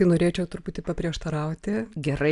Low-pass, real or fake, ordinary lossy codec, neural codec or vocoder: 10.8 kHz; real; AAC, 96 kbps; none